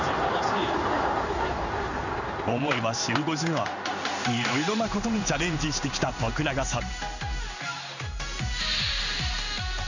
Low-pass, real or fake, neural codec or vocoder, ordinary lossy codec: 7.2 kHz; fake; codec, 16 kHz in and 24 kHz out, 1 kbps, XY-Tokenizer; none